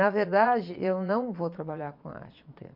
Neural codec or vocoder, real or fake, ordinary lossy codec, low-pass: vocoder, 22.05 kHz, 80 mel bands, Vocos; fake; none; 5.4 kHz